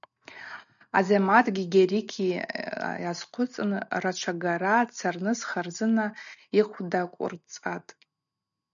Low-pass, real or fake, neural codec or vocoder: 7.2 kHz; real; none